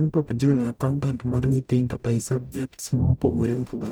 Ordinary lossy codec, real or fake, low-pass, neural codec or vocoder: none; fake; none; codec, 44.1 kHz, 0.9 kbps, DAC